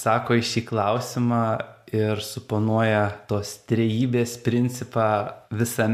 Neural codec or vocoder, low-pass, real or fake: none; 14.4 kHz; real